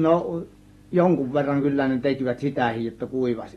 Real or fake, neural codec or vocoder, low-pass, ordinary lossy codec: real; none; 19.8 kHz; AAC, 32 kbps